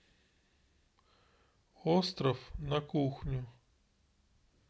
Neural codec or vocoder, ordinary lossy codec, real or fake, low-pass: none; none; real; none